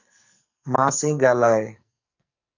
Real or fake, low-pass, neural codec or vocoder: fake; 7.2 kHz; codec, 44.1 kHz, 2.6 kbps, SNAC